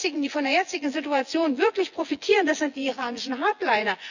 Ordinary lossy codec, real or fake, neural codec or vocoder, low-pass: none; fake; vocoder, 24 kHz, 100 mel bands, Vocos; 7.2 kHz